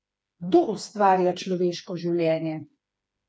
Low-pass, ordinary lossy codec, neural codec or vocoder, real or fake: none; none; codec, 16 kHz, 4 kbps, FreqCodec, smaller model; fake